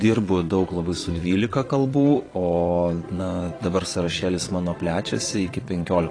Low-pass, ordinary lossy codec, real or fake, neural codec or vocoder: 9.9 kHz; AAC, 32 kbps; fake; vocoder, 22.05 kHz, 80 mel bands, Vocos